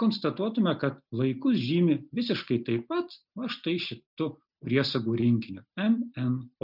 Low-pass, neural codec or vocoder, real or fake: 5.4 kHz; none; real